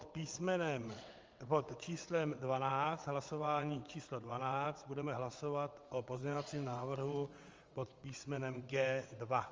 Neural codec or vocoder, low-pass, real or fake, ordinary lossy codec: vocoder, 22.05 kHz, 80 mel bands, WaveNeXt; 7.2 kHz; fake; Opus, 32 kbps